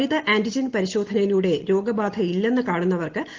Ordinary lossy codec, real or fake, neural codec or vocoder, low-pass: Opus, 16 kbps; real; none; 7.2 kHz